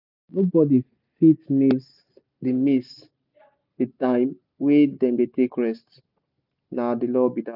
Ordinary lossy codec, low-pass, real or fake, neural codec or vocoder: none; 5.4 kHz; real; none